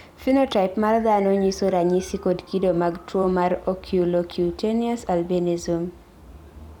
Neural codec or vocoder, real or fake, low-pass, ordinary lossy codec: vocoder, 44.1 kHz, 128 mel bands every 256 samples, BigVGAN v2; fake; 19.8 kHz; none